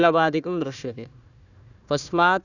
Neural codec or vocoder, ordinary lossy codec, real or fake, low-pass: codec, 16 kHz, 1 kbps, FunCodec, trained on Chinese and English, 50 frames a second; none; fake; 7.2 kHz